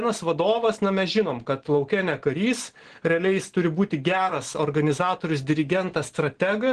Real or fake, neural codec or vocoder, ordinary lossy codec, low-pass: real; none; Opus, 16 kbps; 9.9 kHz